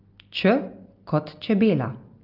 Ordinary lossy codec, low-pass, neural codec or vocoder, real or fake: Opus, 24 kbps; 5.4 kHz; none; real